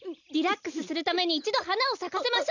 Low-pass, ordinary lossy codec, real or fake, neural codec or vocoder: 7.2 kHz; none; real; none